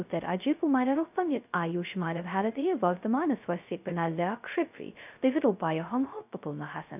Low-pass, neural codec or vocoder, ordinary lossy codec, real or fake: 3.6 kHz; codec, 16 kHz, 0.2 kbps, FocalCodec; none; fake